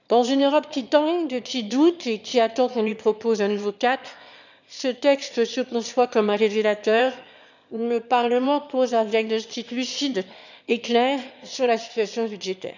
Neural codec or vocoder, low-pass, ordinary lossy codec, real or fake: autoencoder, 22.05 kHz, a latent of 192 numbers a frame, VITS, trained on one speaker; 7.2 kHz; none; fake